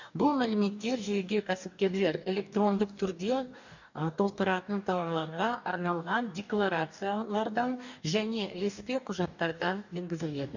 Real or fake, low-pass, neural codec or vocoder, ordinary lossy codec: fake; 7.2 kHz; codec, 44.1 kHz, 2.6 kbps, DAC; none